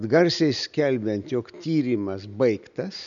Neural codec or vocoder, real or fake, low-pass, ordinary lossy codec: none; real; 7.2 kHz; MP3, 96 kbps